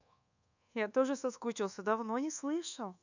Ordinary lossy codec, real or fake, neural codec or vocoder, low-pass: none; fake; codec, 24 kHz, 1.2 kbps, DualCodec; 7.2 kHz